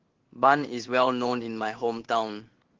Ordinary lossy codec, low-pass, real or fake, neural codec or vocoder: Opus, 16 kbps; 7.2 kHz; fake; codec, 16 kHz in and 24 kHz out, 1 kbps, XY-Tokenizer